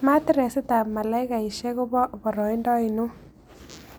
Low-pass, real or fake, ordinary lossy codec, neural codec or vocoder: none; real; none; none